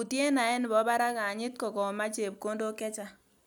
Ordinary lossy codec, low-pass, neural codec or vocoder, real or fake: none; none; none; real